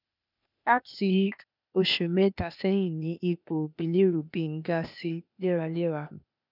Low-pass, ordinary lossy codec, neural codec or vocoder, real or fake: 5.4 kHz; none; codec, 16 kHz, 0.8 kbps, ZipCodec; fake